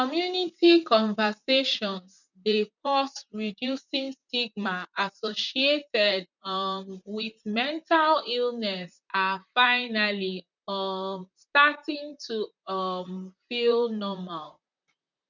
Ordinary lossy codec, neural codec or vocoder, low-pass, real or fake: none; vocoder, 44.1 kHz, 128 mel bands, Pupu-Vocoder; 7.2 kHz; fake